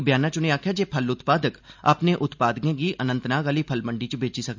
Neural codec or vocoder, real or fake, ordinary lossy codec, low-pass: none; real; MP3, 64 kbps; 7.2 kHz